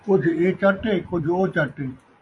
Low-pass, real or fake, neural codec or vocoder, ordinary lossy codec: 10.8 kHz; fake; vocoder, 24 kHz, 100 mel bands, Vocos; MP3, 48 kbps